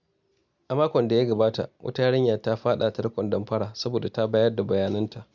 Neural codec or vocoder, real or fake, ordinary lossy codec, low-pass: none; real; none; 7.2 kHz